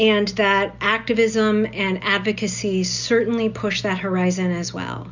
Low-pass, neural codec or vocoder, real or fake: 7.2 kHz; none; real